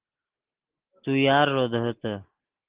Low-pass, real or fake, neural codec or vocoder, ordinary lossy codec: 3.6 kHz; real; none; Opus, 16 kbps